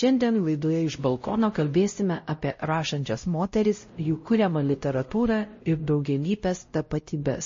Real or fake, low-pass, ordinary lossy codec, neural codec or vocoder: fake; 7.2 kHz; MP3, 32 kbps; codec, 16 kHz, 0.5 kbps, X-Codec, WavLM features, trained on Multilingual LibriSpeech